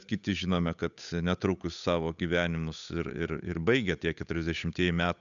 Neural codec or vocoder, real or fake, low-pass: codec, 16 kHz, 8 kbps, FunCodec, trained on Chinese and English, 25 frames a second; fake; 7.2 kHz